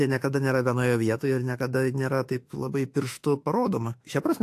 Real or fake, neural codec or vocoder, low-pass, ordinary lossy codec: fake; autoencoder, 48 kHz, 32 numbers a frame, DAC-VAE, trained on Japanese speech; 14.4 kHz; AAC, 64 kbps